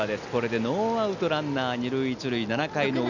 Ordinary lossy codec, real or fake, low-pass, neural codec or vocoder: none; real; 7.2 kHz; none